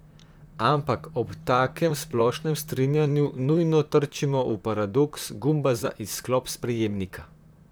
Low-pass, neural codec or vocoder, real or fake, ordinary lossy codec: none; vocoder, 44.1 kHz, 128 mel bands, Pupu-Vocoder; fake; none